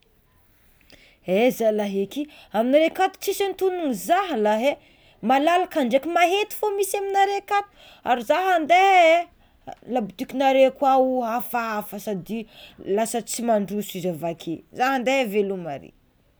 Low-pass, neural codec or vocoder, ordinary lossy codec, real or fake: none; none; none; real